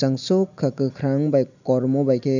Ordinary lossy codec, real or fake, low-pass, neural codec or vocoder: none; real; 7.2 kHz; none